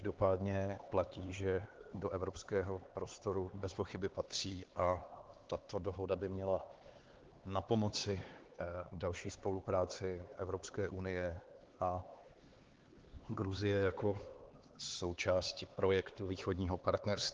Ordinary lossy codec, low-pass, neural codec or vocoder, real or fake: Opus, 16 kbps; 7.2 kHz; codec, 16 kHz, 4 kbps, X-Codec, HuBERT features, trained on LibriSpeech; fake